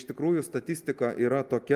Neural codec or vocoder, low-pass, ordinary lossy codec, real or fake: none; 14.4 kHz; Opus, 32 kbps; real